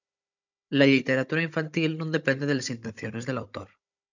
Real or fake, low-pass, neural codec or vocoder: fake; 7.2 kHz; codec, 16 kHz, 16 kbps, FunCodec, trained on Chinese and English, 50 frames a second